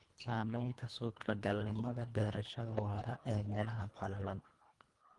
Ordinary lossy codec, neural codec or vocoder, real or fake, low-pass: Opus, 24 kbps; codec, 24 kHz, 1.5 kbps, HILCodec; fake; 10.8 kHz